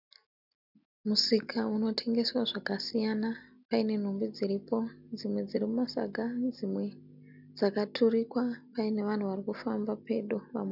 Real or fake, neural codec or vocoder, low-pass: real; none; 5.4 kHz